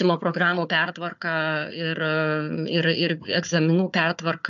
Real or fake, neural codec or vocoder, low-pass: fake; codec, 16 kHz, 4 kbps, FunCodec, trained on Chinese and English, 50 frames a second; 7.2 kHz